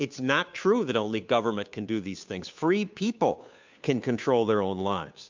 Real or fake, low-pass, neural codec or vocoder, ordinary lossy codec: fake; 7.2 kHz; codec, 16 kHz, 2 kbps, FunCodec, trained on Chinese and English, 25 frames a second; MP3, 64 kbps